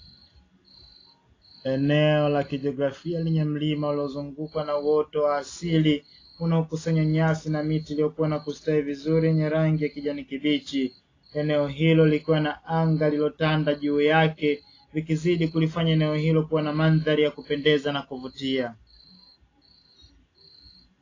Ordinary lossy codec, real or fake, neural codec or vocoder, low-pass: AAC, 32 kbps; real; none; 7.2 kHz